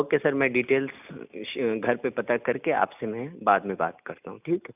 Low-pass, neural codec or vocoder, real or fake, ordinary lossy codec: 3.6 kHz; none; real; none